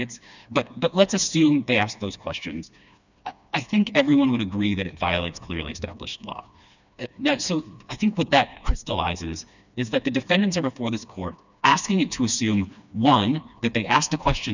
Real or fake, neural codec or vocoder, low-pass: fake; codec, 16 kHz, 2 kbps, FreqCodec, smaller model; 7.2 kHz